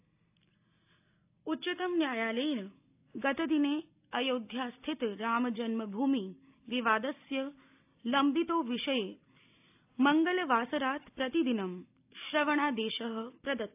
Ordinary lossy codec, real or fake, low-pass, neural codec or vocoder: AAC, 32 kbps; real; 3.6 kHz; none